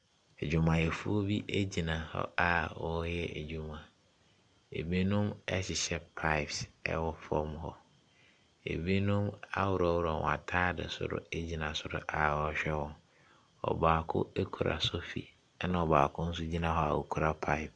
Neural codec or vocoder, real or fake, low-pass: none; real; 9.9 kHz